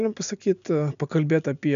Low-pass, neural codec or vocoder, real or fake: 7.2 kHz; none; real